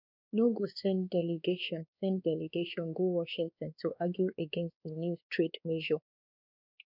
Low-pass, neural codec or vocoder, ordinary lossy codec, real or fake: 5.4 kHz; codec, 16 kHz, 2 kbps, X-Codec, WavLM features, trained on Multilingual LibriSpeech; none; fake